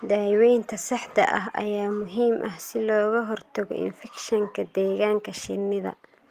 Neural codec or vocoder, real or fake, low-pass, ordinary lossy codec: none; real; 14.4 kHz; Opus, 24 kbps